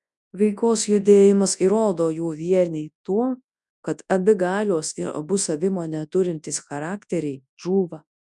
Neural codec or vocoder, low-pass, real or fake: codec, 24 kHz, 0.9 kbps, WavTokenizer, large speech release; 10.8 kHz; fake